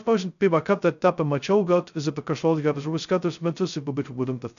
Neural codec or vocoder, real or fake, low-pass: codec, 16 kHz, 0.2 kbps, FocalCodec; fake; 7.2 kHz